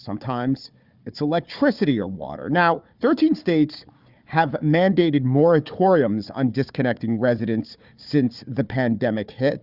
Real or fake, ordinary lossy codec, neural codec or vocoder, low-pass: fake; Opus, 64 kbps; codec, 16 kHz, 4 kbps, FunCodec, trained on Chinese and English, 50 frames a second; 5.4 kHz